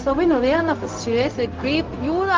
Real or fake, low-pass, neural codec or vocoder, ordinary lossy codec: fake; 7.2 kHz; codec, 16 kHz, 0.4 kbps, LongCat-Audio-Codec; Opus, 32 kbps